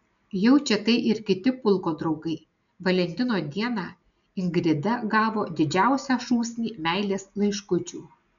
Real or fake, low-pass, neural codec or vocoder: real; 7.2 kHz; none